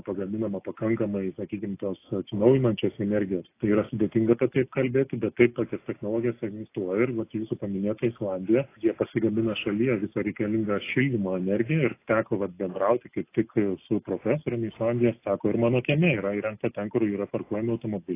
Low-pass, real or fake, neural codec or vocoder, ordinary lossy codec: 3.6 kHz; real; none; AAC, 24 kbps